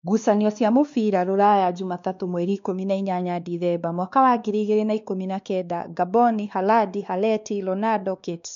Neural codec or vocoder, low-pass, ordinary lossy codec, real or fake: codec, 16 kHz, 2 kbps, X-Codec, WavLM features, trained on Multilingual LibriSpeech; 7.2 kHz; MP3, 64 kbps; fake